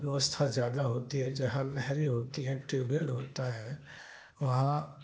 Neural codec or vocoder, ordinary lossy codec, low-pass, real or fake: codec, 16 kHz, 0.8 kbps, ZipCodec; none; none; fake